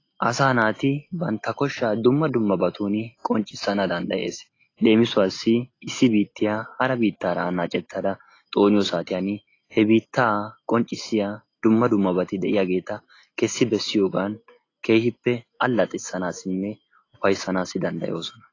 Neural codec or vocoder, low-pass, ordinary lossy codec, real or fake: none; 7.2 kHz; AAC, 32 kbps; real